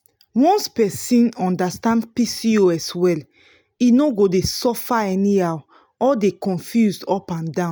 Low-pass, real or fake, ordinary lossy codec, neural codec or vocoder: none; real; none; none